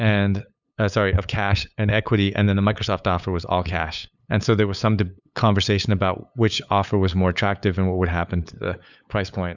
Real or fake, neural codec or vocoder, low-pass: fake; codec, 16 kHz, 8 kbps, FunCodec, trained on LibriTTS, 25 frames a second; 7.2 kHz